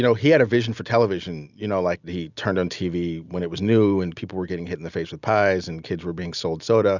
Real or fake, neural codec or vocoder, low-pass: real; none; 7.2 kHz